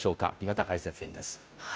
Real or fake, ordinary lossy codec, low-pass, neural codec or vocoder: fake; none; none; codec, 16 kHz, 0.5 kbps, FunCodec, trained on Chinese and English, 25 frames a second